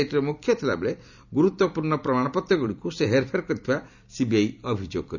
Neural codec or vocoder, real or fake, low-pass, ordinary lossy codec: vocoder, 44.1 kHz, 128 mel bands every 256 samples, BigVGAN v2; fake; 7.2 kHz; none